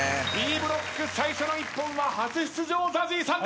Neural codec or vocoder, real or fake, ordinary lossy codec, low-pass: none; real; none; none